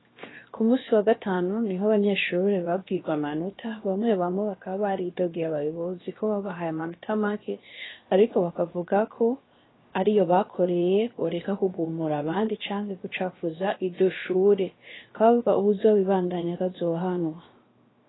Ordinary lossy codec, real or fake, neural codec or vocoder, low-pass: AAC, 16 kbps; fake; codec, 16 kHz, 0.7 kbps, FocalCodec; 7.2 kHz